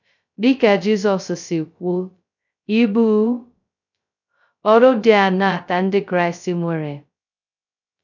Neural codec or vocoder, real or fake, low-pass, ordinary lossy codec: codec, 16 kHz, 0.2 kbps, FocalCodec; fake; 7.2 kHz; none